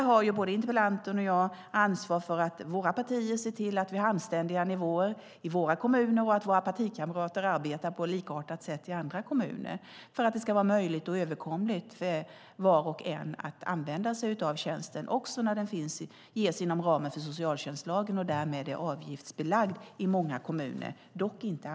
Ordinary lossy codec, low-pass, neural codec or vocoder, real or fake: none; none; none; real